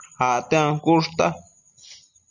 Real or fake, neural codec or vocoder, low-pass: real; none; 7.2 kHz